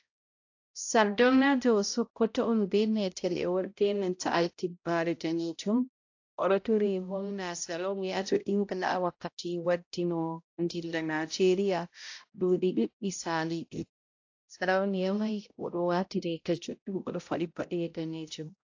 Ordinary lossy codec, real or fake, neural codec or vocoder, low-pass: AAC, 48 kbps; fake; codec, 16 kHz, 0.5 kbps, X-Codec, HuBERT features, trained on balanced general audio; 7.2 kHz